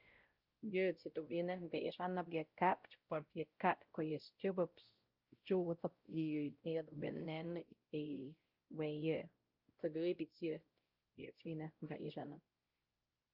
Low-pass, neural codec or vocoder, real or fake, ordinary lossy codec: 5.4 kHz; codec, 16 kHz, 0.5 kbps, X-Codec, WavLM features, trained on Multilingual LibriSpeech; fake; Opus, 24 kbps